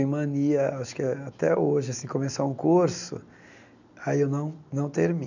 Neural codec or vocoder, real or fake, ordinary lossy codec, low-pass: none; real; none; 7.2 kHz